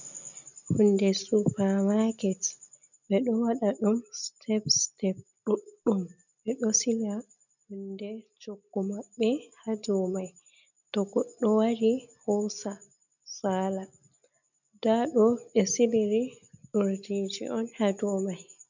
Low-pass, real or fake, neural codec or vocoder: 7.2 kHz; real; none